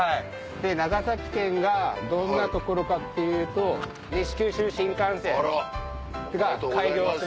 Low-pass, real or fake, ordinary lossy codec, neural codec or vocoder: none; real; none; none